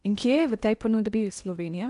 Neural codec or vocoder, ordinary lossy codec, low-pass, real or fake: codec, 16 kHz in and 24 kHz out, 0.8 kbps, FocalCodec, streaming, 65536 codes; none; 10.8 kHz; fake